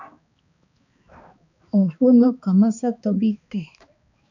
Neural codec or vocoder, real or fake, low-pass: codec, 16 kHz, 2 kbps, X-Codec, HuBERT features, trained on balanced general audio; fake; 7.2 kHz